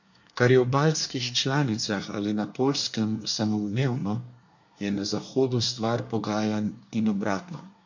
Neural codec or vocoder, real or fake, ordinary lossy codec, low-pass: codec, 24 kHz, 1 kbps, SNAC; fake; MP3, 48 kbps; 7.2 kHz